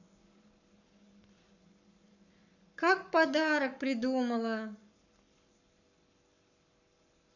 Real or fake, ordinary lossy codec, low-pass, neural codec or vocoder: fake; none; 7.2 kHz; codec, 44.1 kHz, 7.8 kbps, Pupu-Codec